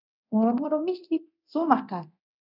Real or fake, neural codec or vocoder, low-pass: fake; codec, 24 kHz, 0.9 kbps, DualCodec; 5.4 kHz